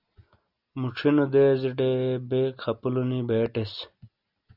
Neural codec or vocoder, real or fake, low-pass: none; real; 5.4 kHz